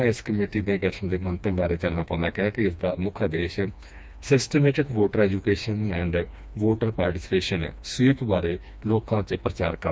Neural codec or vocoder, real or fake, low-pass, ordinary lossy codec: codec, 16 kHz, 2 kbps, FreqCodec, smaller model; fake; none; none